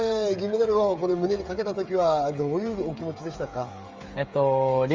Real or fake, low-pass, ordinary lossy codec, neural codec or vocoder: fake; 7.2 kHz; Opus, 32 kbps; codec, 16 kHz, 16 kbps, FreqCodec, smaller model